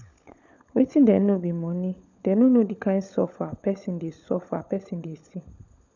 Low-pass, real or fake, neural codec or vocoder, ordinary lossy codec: 7.2 kHz; fake; codec, 16 kHz, 16 kbps, FunCodec, trained on LibriTTS, 50 frames a second; none